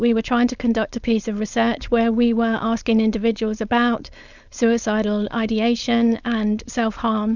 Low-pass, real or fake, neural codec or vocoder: 7.2 kHz; fake; codec, 16 kHz, 4.8 kbps, FACodec